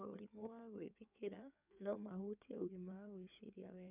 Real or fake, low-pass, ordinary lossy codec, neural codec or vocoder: fake; 3.6 kHz; none; codec, 16 kHz in and 24 kHz out, 2.2 kbps, FireRedTTS-2 codec